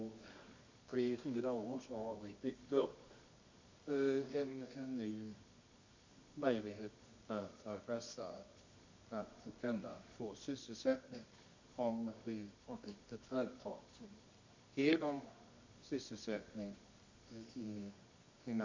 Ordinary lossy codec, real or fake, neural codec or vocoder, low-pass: MP3, 48 kbps; fake; codec, 24 kHz, 0.9 kbps, WavTokenizer, medium music audio release; 7.2 kHz